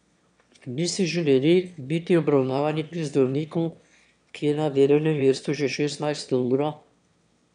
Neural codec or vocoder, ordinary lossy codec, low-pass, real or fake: autoencoder, 22.05 kHz, a latent of 192 numbers a frame, VITS, trained on one speaker; none; 9.9 kHz; fake